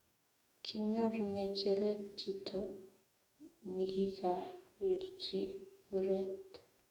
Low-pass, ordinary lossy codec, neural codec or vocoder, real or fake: 19.8 kHz; none; codec, 44.1 kHz, 2.6 kbps, DAC; fake